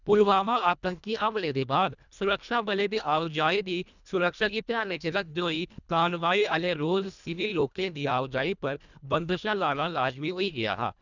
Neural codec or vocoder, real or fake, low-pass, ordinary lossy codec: codec, 24 kHz, 1.5 kbps, HILCodec; fake; 7.2 kHz; none